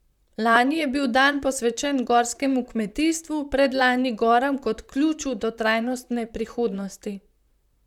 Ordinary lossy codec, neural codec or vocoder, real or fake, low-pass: none; vocoder, 44.1 kHz, 128 mel bands, Pupu-Vocoder; fake; 19.8 kHz